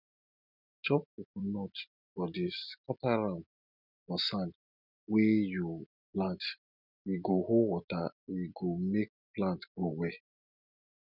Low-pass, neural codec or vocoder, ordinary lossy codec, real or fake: 5.4 kHz; none; none; real